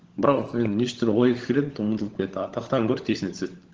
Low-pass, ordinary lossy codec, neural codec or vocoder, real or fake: 7.2 kHz; Opus, 16 kbps; codec, 16 kHz, 16 kbps, FunCodec, trained on LibriTTS, 50 frames a second; fake